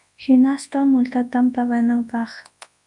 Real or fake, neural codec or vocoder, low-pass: fake; codec, 24 kHz, 0.9 kbps, WavTokenizer, large speech release; 10.8 kHz